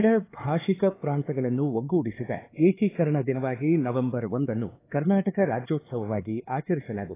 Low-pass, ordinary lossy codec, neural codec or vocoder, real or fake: 3.6 kHz; AAC, 16 kbps; codec, 16 kHz, 4 kbps, X-Codec, HuBERT features, trained on LibriSpeech; fake